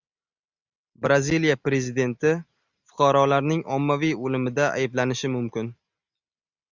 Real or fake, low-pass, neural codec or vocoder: real; 7.2 kHz; none